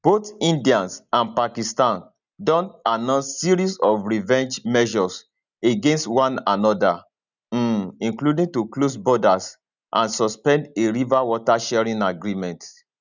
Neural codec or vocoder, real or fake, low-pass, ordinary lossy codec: none; real; 7.2 kHz; none